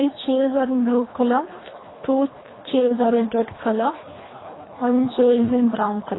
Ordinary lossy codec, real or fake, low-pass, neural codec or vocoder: AAC, 16 kbps; fake; 7.2 kHz; codec, 24 kHz, 1.5 kbps, HILCodec